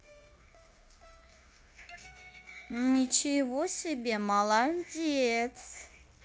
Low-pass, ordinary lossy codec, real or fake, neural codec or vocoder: none; none; fake; codec, 16 kHz, 0.9 kbps, LongCat-Audio-Codec